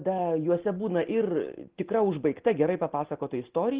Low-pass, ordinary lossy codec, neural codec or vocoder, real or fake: 3.6 kHz; Opus, 16 kbps; none; real